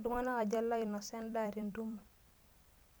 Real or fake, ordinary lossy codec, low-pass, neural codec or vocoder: fake; none; none; vocoder, 44.1 kHz, 128 mel bands every 256 samples, BigVGAN v2